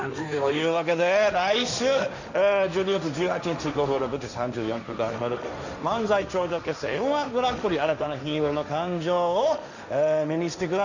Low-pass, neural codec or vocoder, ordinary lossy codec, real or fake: 7.2 kHz; codec, 16 kHz, 1.1 kbps, Voila-Tokenizer; none; fake